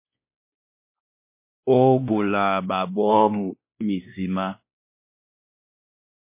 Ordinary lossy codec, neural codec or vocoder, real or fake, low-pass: MP3, 24 kbps; codec, 16 kHz, 1 kbps, X-Codec, WavLM features, trained on Multilingual LibriSpeech; fake; 3.6 kHz